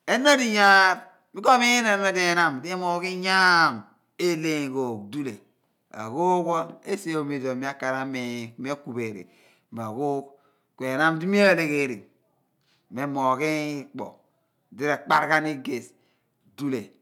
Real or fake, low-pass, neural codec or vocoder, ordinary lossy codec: real; 19.8 kHz; none; none